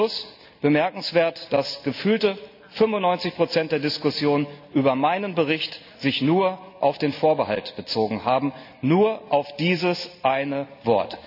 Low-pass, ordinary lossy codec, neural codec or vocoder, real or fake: 5.4 kHz; none; none; real